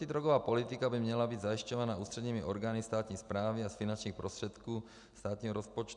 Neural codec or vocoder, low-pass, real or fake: none; 10.8 kHz; real